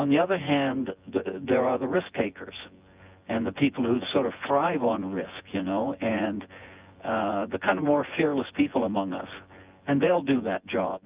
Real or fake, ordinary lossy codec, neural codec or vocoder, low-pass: fake; Opus, 24 kbps; vocoder, 24 kHz, 100 mel bands, Vocos; 3.6 kHz